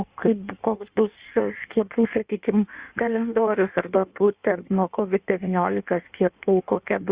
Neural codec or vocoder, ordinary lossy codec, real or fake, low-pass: codec, 16 kHz in and 24 kHz out, 1.1 kbps, FireRedTTS-2 codec; Opus, 64 kbps; fake; 3.6 kHz